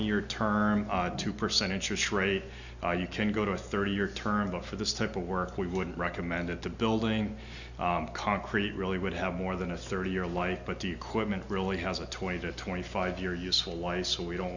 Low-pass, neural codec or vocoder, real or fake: 7.2 kHz; none; real